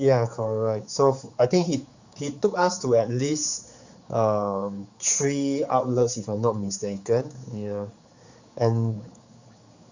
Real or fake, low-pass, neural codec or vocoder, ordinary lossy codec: fake; 7.2 kHz; codec, 16 kHz, 4 kbps, X-Codec, HuBERT features, trained on general audio; Opus, 64 kbps